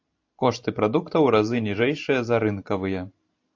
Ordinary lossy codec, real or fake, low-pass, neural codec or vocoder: AAC, 48 kbps; fake; 7.2 kHz; vocoder, 44.1 kHz, 128 mel bands every 512 samples, BigVGAN v2